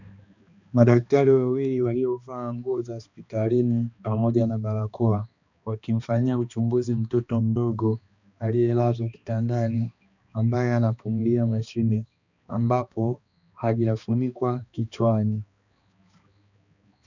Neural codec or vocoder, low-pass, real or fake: codec, 16 kHz, 2 kbps, X-Codec, HuBERT features, trained on balanced general audio; 7.2 kHz; fake